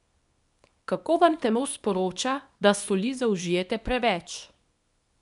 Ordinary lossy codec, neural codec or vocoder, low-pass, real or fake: none; codec, 24 kHz, 0.9 kbps, WavTokenizer, small release; 10.8 kHz; fake